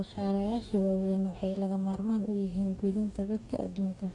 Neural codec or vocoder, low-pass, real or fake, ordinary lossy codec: codec, 44.1 kHz, 2.6 kbps, DAC; 10.8 kHz; fake; AAC, 48 kbps